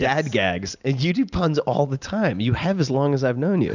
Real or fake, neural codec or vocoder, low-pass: real; none; 7.2 kHz